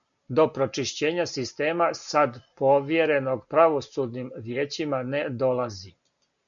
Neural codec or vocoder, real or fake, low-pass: none; real; 7.2 kHz